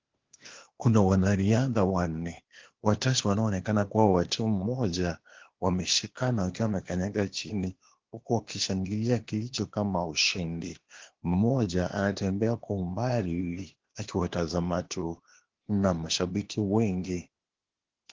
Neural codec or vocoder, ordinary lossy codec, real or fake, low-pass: codec, 16 kHz, 0.8 kbps, ZipCodec; Opus, 16 kbps; fake; 7.2 kHz